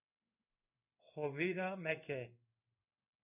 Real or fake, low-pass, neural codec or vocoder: fake; 3.6 kHz; codec, 16 kHz in and 24 kHz out, 1 kbps, XY-Tokenizer